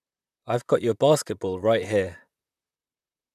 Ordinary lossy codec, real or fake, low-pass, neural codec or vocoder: none; fake; 14.4 kHz; vocoder, 44.1 kHz, 128 mel bands, Pupu-Vocoder